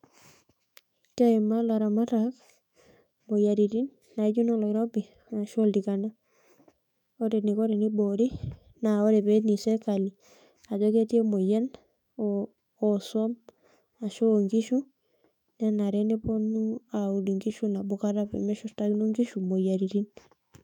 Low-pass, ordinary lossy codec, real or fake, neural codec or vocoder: 19.8 kHz; none; fake; autoencoder, 48 kHz, 128 numbers a frame, DAC-VAE, trained on Japanese speech